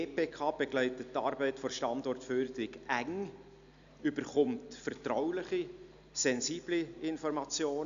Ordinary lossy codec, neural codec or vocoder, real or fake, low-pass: none; none; real; 7.2 kHz